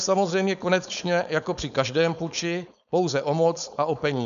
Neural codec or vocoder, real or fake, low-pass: codec, 16 kHz, 4.8 kbps, FACodec; fake; 7.2 kHz